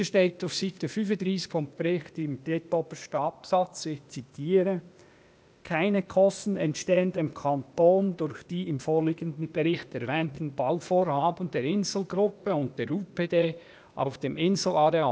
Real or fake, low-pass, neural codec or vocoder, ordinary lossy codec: fake; none; codec, 16 kHz, 0.8 kbps, ZipCodec; none